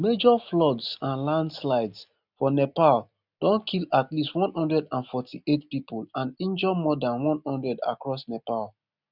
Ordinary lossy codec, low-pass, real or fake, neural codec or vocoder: none; 5.4 kHz; real; none